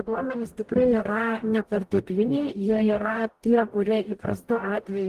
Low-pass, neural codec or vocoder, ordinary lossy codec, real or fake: 14.4 kHz; codec, 44.1 kHz, 0.9 kbps, DAC; Opus, 24 kbps; fake